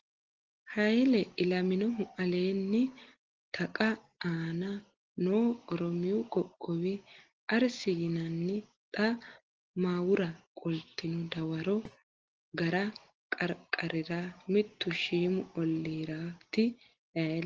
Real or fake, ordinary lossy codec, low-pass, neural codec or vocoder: real; Opus, 16 kbps; 7.2 kHz; none